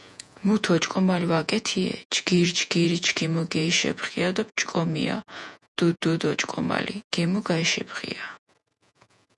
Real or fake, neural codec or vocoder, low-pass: fake; vocoder, 48 kHz, 128 mel bands, Vocos; 10.8 kHz